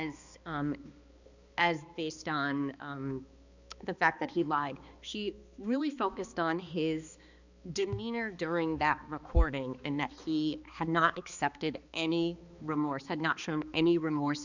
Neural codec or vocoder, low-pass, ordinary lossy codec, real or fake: codec, 16 kHz, 2 kbps, X-Codec, HuBERT features, trained on balanced general audio; 7.2 kHz; Opus, 64 kbps; fake